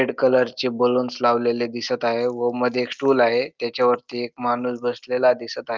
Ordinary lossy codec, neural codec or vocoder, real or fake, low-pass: Opus, 32 kbps; none; real; 7.2 kHz